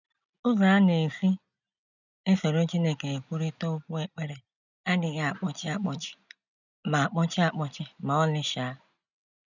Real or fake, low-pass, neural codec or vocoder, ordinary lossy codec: real; 7.2 kHz; none; none